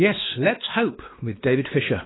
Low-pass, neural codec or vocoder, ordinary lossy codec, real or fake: 7.2 kHz; codec, 16 kHz, 2 kbps, X-Codec, WavLM features, trained on Multilingual LibriSpeech; AAC, 16 kbps; fake